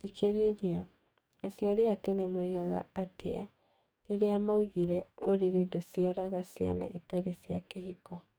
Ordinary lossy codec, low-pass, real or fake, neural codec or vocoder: none; none; fake; codec, 44.1 kHz, 2.6 kbps, DAC